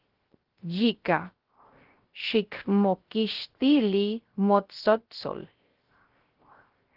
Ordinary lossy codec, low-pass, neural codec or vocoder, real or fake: Opus, 16 kbps; 5.4 kHz; codec, 16 kHz, 0.3 kbps, FocalCodec; fake